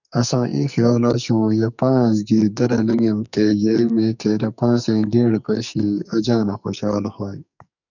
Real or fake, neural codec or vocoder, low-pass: fake; codec, 44.1 kHz, 2.6 kbps, SNAC; 7.2 kHz